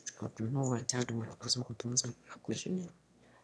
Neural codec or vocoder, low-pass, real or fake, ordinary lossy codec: autoencoder, 22.05 kHz, a latent of 192 numbers a frame, VITS, trained on one speaker; none; fake; none